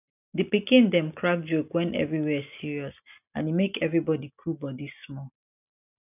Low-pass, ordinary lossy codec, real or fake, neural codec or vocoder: 3.6 kHz; none; real; none